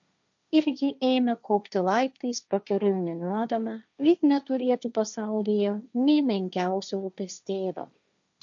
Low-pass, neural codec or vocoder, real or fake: 7.2 kHz; codec, 16 kHz, 1.1 kbps, Voila-Tokenizer; fake